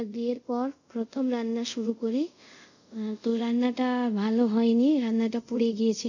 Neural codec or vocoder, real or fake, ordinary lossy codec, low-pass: codec, 24 kHz, 0.5 kbps, DualCodec; fake; none; 7.2 kHz